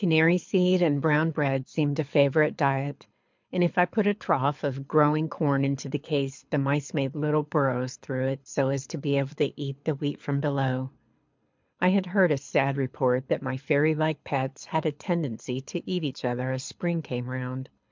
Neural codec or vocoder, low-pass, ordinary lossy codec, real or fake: codec, 24 kHz, 6 kbps, HILCodec; 7.2 kHz; MP3, 64 kbps; fake